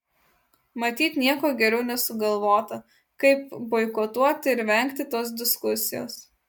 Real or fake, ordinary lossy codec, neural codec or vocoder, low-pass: real; MP3, 96 kbps; none; 19.8 kHz